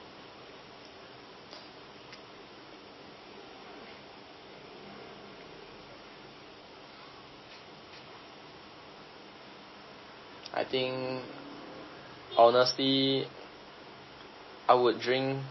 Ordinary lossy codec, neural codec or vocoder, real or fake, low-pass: MP3, 24 kbps; none; real; 7.2 kHz